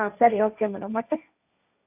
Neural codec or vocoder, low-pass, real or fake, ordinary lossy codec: codec, 16 kHz, 1.1 kbps, Voila-Tokenizer; 3.6 kHz; fake; none